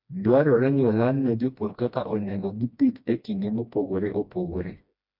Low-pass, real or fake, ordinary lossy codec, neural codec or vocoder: 5.4 kHz; fake; MP3, 48 kbps; codec, 16 kHz, 1 kbps, FreqCodec, smaller model